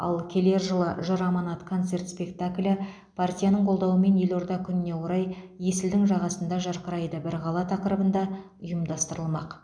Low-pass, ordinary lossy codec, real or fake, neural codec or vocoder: 9.9 kHz; none; real; none